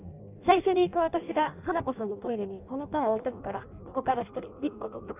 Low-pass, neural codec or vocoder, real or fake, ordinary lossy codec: 3.6 kHz; codec, 16 kHz in and 24 kHz out, 0.6 kbps, FireRedTTS-2 codec; fake; none